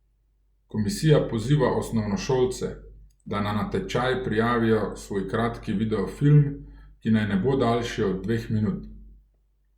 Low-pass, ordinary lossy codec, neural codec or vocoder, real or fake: 19.8 kHz; Opus, 64 kbps; none; real